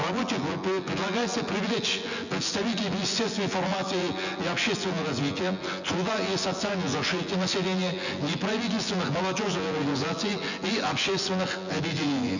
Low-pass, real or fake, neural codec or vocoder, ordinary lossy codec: 7.2 kHz; fake; vocoder, 24 kHz, 100 mel bands, Vocos; none